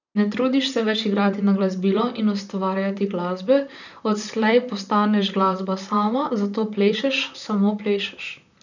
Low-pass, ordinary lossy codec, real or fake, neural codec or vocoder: 7.2 kHz; none; fake; vocoder, 44.1 kHz, 128 mel bands, Pupu-Vocoder